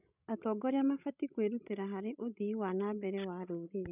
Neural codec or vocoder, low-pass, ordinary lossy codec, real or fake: codec, 16 kHz, 16 kbps, FreqCodec, larger model; 3.6 kHz; none; fake